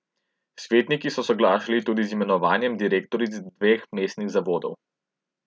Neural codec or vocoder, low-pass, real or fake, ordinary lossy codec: none; none; real; none